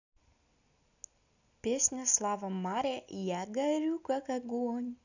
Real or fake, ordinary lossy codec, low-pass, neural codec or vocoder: real; none; 7.2 kHz; none